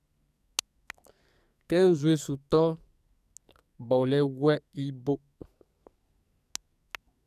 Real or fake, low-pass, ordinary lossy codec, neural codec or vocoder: fake; 14.4 kHz; none; codec, 44.1 kHz, 2.6 kbps, SNAC